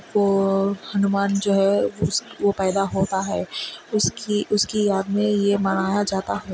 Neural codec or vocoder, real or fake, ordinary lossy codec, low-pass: none; real; none; none